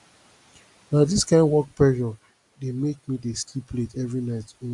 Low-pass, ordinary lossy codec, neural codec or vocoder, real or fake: 10.8 kHz; Opus, 64 kbps; none; real